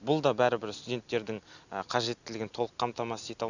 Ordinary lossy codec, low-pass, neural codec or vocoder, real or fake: none; 7.2 kHz; none; real